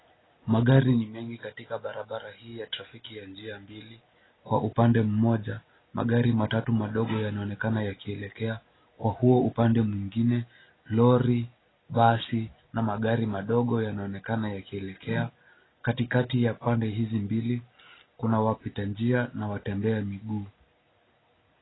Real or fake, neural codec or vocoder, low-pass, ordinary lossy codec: real; none; 7.2 kHz; AAC, 16 kbps